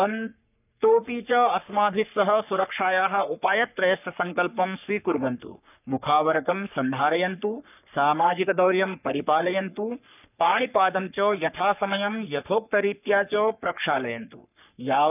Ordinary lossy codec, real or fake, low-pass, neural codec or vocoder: none; fake; 3.6 kHz; codec, 44.1 kHz, 3.4 kbps, Pupu-Codec